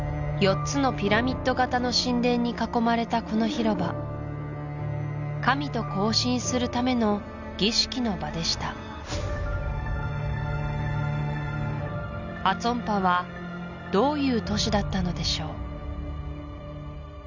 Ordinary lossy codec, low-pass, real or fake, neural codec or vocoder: none; 7.2 kHz; real; none